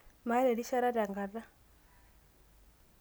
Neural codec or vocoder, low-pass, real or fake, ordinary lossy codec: none; none; real; none